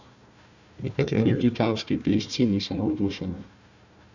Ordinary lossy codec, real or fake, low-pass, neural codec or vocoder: none; fake; 7.2 kHz; codec, 16 kHz, 1 kbps, FunCodec, trained on Chinese and English, 50 frames a second